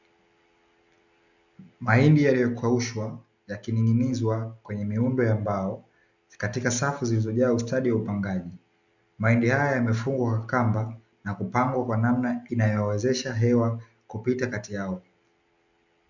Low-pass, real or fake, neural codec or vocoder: 7.2 kHz; real; none